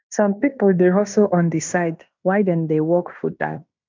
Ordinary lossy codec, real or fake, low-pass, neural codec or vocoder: MP3, 64 kbps; fake; 7.2 kHz; codec, 16 kHz in and 24 kHz out, 0.9 kbps, LongCat-Audio-Codec, fine tuned four codebook decoder